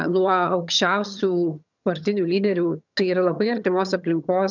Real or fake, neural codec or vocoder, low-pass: fake; vocoder, 22.05 kHz, 80 mel bands, HiFi-GAN; 7.2 kHz